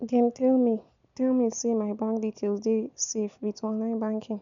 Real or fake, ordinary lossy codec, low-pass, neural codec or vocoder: real; none; 7.2 kHz; none